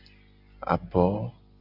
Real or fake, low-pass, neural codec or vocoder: real; 5.4 kHz; none